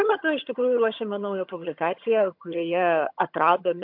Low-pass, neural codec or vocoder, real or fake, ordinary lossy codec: 5.4 kHz; vocoder, 22.05 kHz, 80 mel bands, HiFi-GAN; fake; MP3, 48 kbps